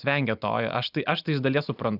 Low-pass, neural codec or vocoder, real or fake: 5.4 kHz; none; real